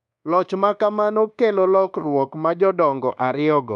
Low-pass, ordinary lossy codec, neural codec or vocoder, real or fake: 10.8 kHz; none; codec, 24 kHz, 1.2 kbps, DualCodec; fake